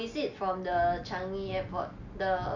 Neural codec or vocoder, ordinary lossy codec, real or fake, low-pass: none; none; real; 7.2 kHz